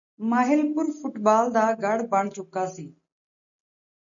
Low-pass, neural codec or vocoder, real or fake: 7.2 kHz; none; real